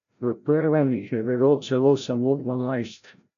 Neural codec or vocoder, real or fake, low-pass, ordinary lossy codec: codec, 16 kHz, 0.5 kbps, FreqCodec, larger model; fake; 7.2 kHz; none